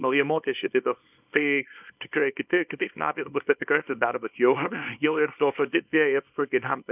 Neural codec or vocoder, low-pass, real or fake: codec, 24 kHz, 0.9 kbps, WavTokenizer, small release; 3.6 kHz; fake